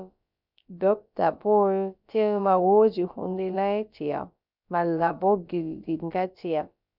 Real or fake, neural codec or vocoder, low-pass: fake; codec, 16 kHz, about 1 kbps, DyCAST, with the encoder's durations; 5.4 kHz